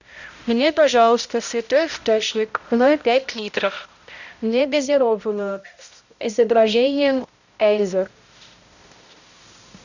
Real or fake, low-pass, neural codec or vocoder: fake; 7.2 kHz; codec, 16 kHz, 0.5 kbps, X-Codec, HuBERT features, trained on balanced general audio